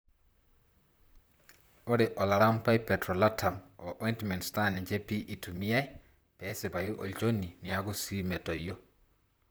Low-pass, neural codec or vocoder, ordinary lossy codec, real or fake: none; vocoder, 44.1 kHz, 128 mel bands, Pupu-Vocoder; none; fake